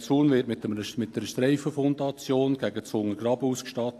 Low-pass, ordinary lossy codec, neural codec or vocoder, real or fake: 14.4 kHz; AAC, 64 kbps; none; real